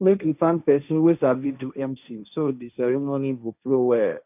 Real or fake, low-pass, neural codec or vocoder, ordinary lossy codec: fake; 3.6 kHz; codec, 16 kHz, 1.1 kbps, Voila-Tokenizer; none